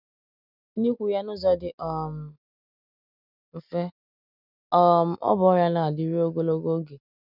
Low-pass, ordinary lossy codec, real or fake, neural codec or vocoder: 5.4 kHz; none; real; none